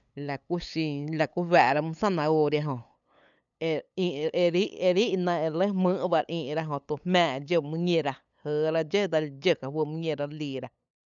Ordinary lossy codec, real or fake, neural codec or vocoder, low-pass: none; fake; codec, 16 kHz, 8 kbps, FunCodec, trained on LibriTTS, 25 frames a second; 7.2 kHz